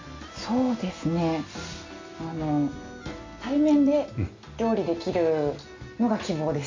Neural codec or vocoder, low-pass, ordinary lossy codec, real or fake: none; 7.2 kHz; MP3, 64 kbps; real